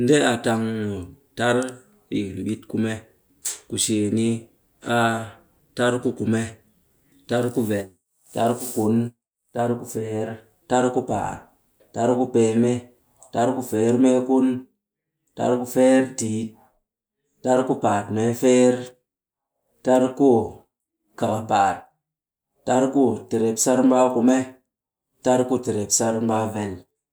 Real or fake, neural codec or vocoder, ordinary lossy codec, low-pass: real; none; none; none